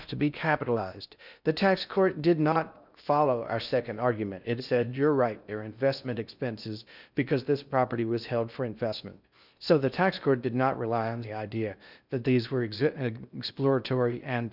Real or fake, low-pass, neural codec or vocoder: fake; 5.4 kHz; codec, 16 kHz in and 24 kHz out, 0.6 kbps, FocalCodec, streaming, 4096 codes